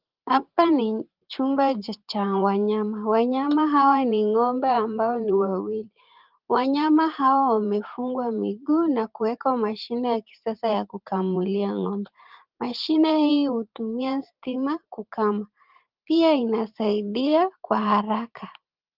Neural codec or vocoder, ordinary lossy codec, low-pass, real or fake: vocoder, 44.1 kHz, 128 mel bands every 512 samples, BigVGAN v2; Opus, 32 kbps; 5.4 kHz; fake